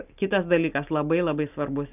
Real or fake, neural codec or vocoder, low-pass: real; none; 3.6 kHz